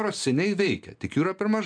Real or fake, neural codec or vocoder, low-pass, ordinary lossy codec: real; none; 9.9 kHz; MP3, 96 kbps